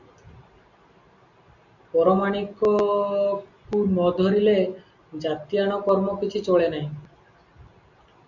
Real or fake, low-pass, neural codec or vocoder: real; 7.2 kHz; none